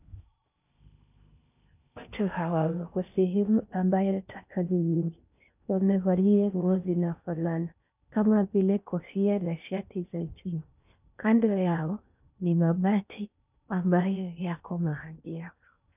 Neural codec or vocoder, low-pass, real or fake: codec, 16 kHz in and 24 kHz out, 0.6 kbps, FocalCodec, streaming, 4096 codes; 3.6 kHz; fake